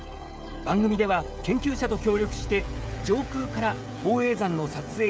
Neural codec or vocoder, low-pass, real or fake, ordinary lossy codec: codec, 16 kHz, 16 kbps, FreqCodec, smaller model; none; fake; none